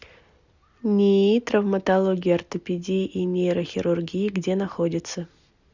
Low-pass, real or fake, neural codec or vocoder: 7.2 kHz; real; none